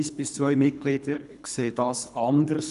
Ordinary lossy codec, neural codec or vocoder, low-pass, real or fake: none; codec, 24 kHz, 3 kbps, HILCodec; 10.8 kHz; fake